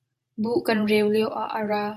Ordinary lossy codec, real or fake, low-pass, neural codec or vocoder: MP3, 64 kbps; fake; 14.4 kHz; vocoder, 44.1 kHz, 128 mel bands every 512 samples, BigVGAN v2